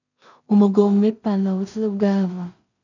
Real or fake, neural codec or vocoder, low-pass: fake; codec, 16 kHz in and 24 kHz out, 0.4 kbps, LongCat-Audio-Codec, two codebook decoder; 7.2 kHz